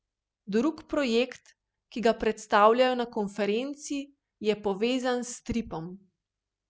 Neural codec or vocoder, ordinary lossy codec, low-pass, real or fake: none; none; none; real